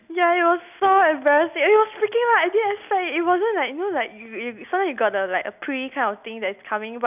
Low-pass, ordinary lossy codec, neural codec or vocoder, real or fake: 3.6 kHz; none; none; real